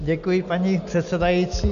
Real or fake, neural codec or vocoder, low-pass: real; none; 7.2 kHz